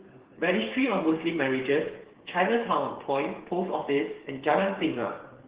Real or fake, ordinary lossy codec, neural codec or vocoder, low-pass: fake; Opus, 16 kbps; codec, 16 kHz, 8 kbps, FreqCodec, smaller model; 3.6 kHz